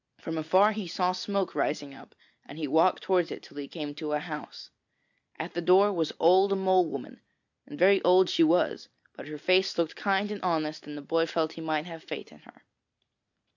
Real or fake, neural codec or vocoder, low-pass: real; none; 7.2 kHz